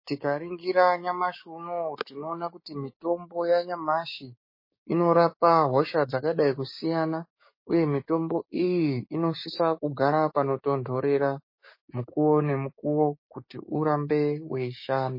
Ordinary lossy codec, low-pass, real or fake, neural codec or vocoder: MP3, 24 kbps; 5.4 kHz; fake; codec, 16 kHz, 6 kbps, DAC